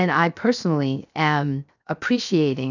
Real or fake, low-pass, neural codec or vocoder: fake; 7.2 kHz; codec, 16 kHz, 0.7 kbps, FocalCodec